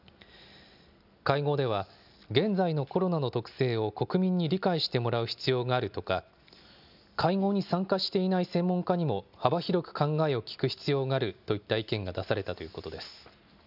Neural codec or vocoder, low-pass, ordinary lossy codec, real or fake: none; 5.4 kHz; none; real